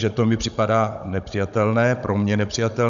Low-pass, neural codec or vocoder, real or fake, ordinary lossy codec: 7.2 kHz; codec, 16 kHz, 16 kbps, FunCodec, trained on Chinese and English, 50 frames a second; fake; MP3, 96 kbps